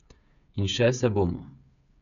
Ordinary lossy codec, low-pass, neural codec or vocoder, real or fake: none; 7.2 kHz; codec, 16 kHz, 8 kbps, FreqCodec, smaller model; fake